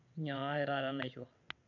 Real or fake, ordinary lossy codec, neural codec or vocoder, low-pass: fake; none; vocoder, 22.05 kHz, 80 mel bands, WaveNeXt; 7.2 kHz